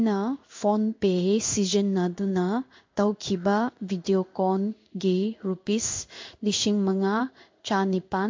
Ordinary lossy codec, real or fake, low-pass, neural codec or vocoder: MP3, 48 kbps; fake; 7.2 kHz; codec, 16 kHz in and 24 kHz out, 1 kbps, XY-Tokenizer